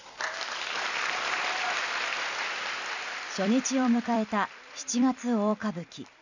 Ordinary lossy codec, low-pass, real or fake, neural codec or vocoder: none; 7.2 kHz; fake; vocoder, 44.1 kHz, 128 mel bands every 256 samples, BigVGAN v2